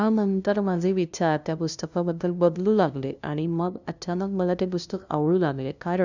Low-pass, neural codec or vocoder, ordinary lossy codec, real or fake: 7.2 kHz; codec, 16 kHz, 0.5 kbps, FunCodec, trained on LibriTTS, 25 frames a second; none; fake